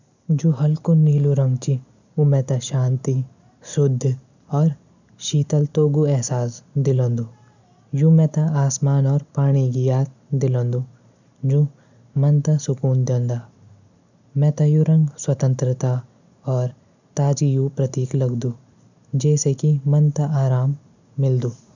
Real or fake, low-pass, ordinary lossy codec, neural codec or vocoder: real; 7.2 kHz; none; none